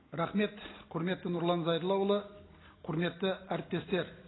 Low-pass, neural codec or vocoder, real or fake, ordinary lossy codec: 7.2 kHz; none; real; AAC, 16 kbps